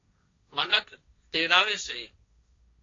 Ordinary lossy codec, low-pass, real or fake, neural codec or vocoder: AAC, 32 kbps; 7.2 kHz; fake; codec, 16 kHz, 1.1 kbps, Voila-Tokenizer